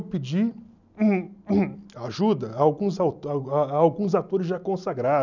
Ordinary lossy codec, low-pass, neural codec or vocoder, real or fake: none; 7.2 kHz; none; real